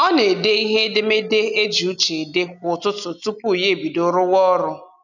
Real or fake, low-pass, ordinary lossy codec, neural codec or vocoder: real; 7.2 kHz; none; none